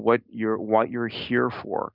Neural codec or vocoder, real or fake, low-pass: none; real; 5.4 kHz